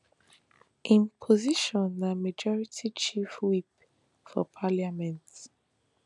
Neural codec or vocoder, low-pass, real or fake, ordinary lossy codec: none; 10.8 kHz; real; none